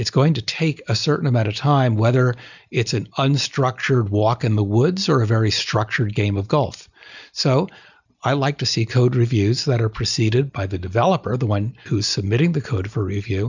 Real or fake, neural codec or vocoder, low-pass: real; none; 7.2 kHz